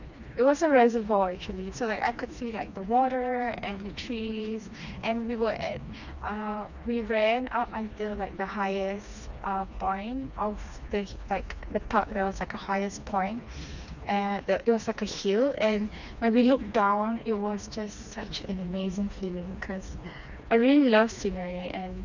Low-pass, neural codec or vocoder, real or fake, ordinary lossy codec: 7.2 kHz; codec, 16 kHz, 2 kbps, FreqCodec, smaller model; fake; none